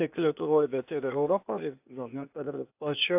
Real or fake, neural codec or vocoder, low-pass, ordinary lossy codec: fake; codec, 16 kHz, 0.8 kbps, ZipCodec; 3.6 kHz; AAC, 32 kbps